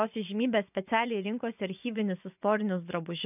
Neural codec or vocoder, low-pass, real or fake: vocoder, 24 kHz, 100 mel bands, Vocos; 3.6 kHz; fake